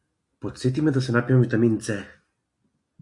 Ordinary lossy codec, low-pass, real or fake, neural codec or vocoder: AAC, 64 kbps; 10.8 kHz; real; none